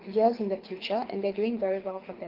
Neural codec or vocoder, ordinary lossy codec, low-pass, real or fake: codec, 24 kHz, 6 kbps, HILCodec; Opus, 16 kbps; 5.4 kHz; fake